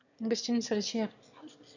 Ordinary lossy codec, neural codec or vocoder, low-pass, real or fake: none; autoencoder, 22.05 kHz, a latent of 192 numbers a frame, VITS, trained on one speaker; 7.2 kHz; fake